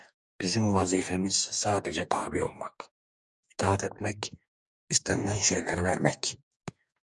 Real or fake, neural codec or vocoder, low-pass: fake; codec, 44.1 kHz, 2.6 kbps, DAC; 10.8 kHz